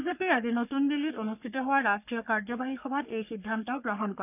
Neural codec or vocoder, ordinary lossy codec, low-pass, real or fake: codec, 44.1 kHz, 3.4 kbps, Pupu-Codec; none; 3.6 kHz; fake